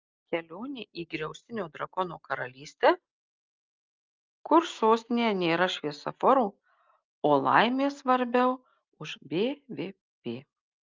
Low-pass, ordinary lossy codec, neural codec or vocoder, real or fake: 7.2 kHz; Opus, 24 kbps; none; real